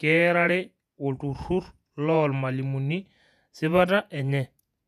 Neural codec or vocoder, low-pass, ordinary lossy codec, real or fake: vocoder, 48 kHz, 128 mel bands, Vocos; 14.4 kHz; none; fake